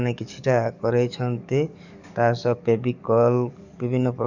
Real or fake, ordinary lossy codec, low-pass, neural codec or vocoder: fake; none; 7.2 kHz; codec, 44.1 kHz, 7.8 kbps, DAC